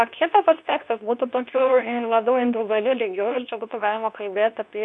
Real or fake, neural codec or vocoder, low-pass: fake; codec, 24 kHz, 0.9 kbps, WavTokenizer, medium speech release version 2; 10.8 kHz